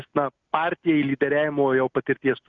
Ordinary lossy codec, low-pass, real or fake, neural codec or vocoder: Opus, 64 kbps; 7.2 kHz; real; none